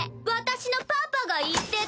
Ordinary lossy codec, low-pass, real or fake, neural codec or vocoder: none; none; real; none